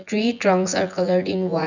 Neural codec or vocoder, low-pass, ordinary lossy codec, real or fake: vocoder, 24 kHz, 100 mel bands, Vocos; 7.2 kHz; none; fake